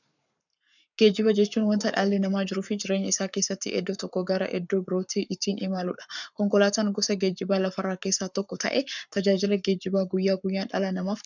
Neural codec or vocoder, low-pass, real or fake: codec, 44.1 kHz, 7.8 kbps, Pupu-Codec; 7.2 kHz; fake